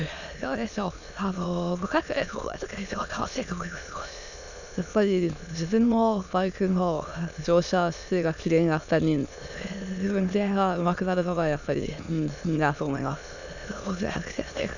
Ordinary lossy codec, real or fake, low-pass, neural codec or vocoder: none; fake; 7.2 kHz; autoencoder, 22.05 kHz, a latent of 192 numbers a frame, VITS, trained on many speakers